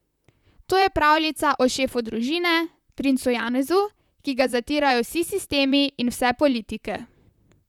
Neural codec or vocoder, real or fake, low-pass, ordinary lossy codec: vocoder, 44.1 kHz, 128 mel bands, Pupu-Vocoder; fake; 19.8 kHz; none